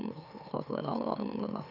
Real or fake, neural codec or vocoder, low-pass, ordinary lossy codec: fake; autoencoder, 44.1 kHz, a latent of 192 numbers a frame, MeloTTS; 5.4 kHz; none